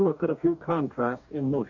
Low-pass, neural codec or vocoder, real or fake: 7.2 kHz; codec, 44.1 kHz, 2.6 kbps, DAC; fake